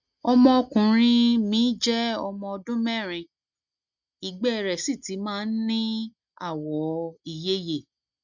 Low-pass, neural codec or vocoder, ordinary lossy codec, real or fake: 7.2 kHz; none; none; real